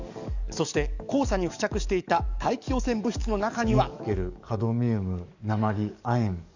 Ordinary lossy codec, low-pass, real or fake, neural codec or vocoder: none; 7.2 kHz; fake; codec, 44.1 kHz, 7.8 kbps, DAC